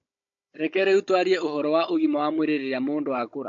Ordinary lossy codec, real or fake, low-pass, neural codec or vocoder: MP3, 48 kbps; fake; 7.2 kHz; codec, 16 kHz, 16 kbps, FunCodec, trained on Chinese and English, 50 frames a second